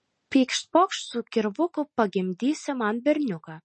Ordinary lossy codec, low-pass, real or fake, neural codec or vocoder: MP3, 32 kbps; 10.8 kHz; real; none